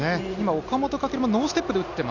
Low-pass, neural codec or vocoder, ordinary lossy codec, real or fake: 7.2 kHz; none; none; real